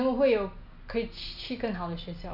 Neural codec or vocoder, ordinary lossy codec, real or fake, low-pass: none; none; real; 5.4 kHz